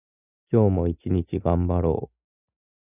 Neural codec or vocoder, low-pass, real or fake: none; 3.6 kHz; real